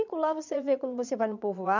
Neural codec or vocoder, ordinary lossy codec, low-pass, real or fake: vocoder, 22.05 kHz, 80 mel bands, Vocos; none; 7.2 kHz; fake